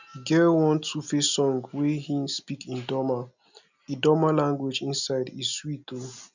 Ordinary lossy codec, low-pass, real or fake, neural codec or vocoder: none; 7.2 kHz; real; none